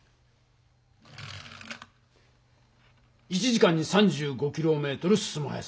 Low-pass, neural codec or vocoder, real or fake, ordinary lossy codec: none; none; real; none